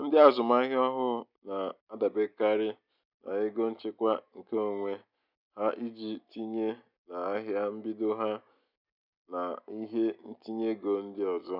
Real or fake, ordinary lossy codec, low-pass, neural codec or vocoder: real; none; 5.4 kHz; none